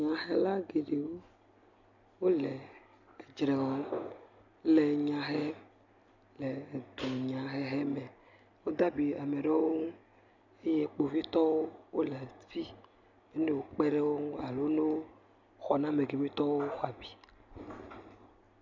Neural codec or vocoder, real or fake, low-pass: none; real; 7.2 kHz